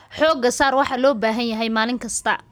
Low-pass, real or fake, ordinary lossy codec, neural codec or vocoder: none; real; none; none